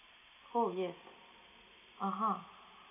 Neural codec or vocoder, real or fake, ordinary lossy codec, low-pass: none; real; none; 3.6 kHz